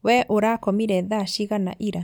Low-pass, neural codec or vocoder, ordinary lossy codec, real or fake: none; none; none; real